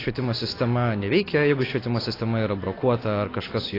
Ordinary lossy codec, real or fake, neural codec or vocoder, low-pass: AAC, 24 kbps; real; none; 5.4 kHz